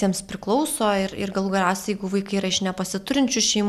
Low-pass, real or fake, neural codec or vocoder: 14.4 kHz; real; none